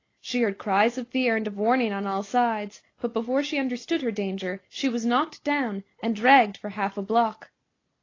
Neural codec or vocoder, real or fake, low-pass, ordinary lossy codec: none; real; 7.2 kHz; AAC, 32 kbps